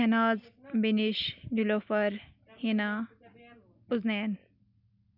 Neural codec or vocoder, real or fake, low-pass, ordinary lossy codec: none; real; 5.4 kHz; none